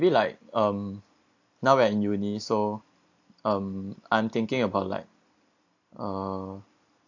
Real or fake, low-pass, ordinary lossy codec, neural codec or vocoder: real; 7.2 kHz; AAC, 48 kbps; none